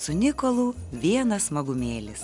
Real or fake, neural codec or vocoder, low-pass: real; none; 10.8 kHz